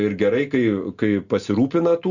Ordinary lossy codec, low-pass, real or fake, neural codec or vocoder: Opus, 64 kbps; 7.2 kHz; real; none